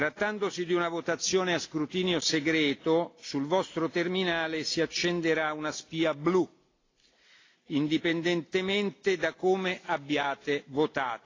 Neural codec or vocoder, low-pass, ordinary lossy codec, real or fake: none; 7.2 kHz; AAC, 32 kbps; real